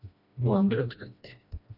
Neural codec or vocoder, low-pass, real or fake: codec, 16 kHz, 1 kbps, FreqCodec, smaller model; 5.4 kHz; fake